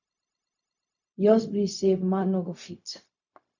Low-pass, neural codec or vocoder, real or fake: 7.2 kHz; codec, 16 kHz, 0.4 kbps, LongCat-Audio-Codec; fake